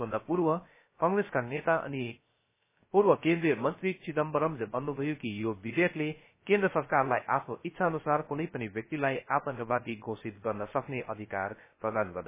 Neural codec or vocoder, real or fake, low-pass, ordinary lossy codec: codec, 16 kHz, 0.3 kbps, FocalCodec; fake; 3.6 kHz; MP3, 16 kbps